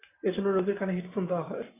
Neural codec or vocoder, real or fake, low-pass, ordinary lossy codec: none; real; 3.6 kHz; AAC, 16 kbps